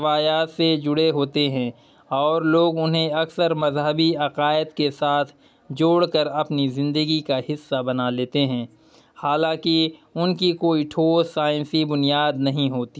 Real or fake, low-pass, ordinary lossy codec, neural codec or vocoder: real; none; none; none